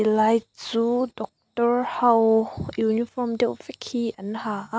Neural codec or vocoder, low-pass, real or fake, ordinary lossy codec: none; none; real; none